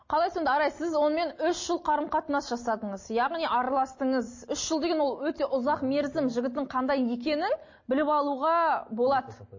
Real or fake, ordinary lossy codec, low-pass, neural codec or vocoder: real; MP3, 32 kbps; 7.2 kHz; none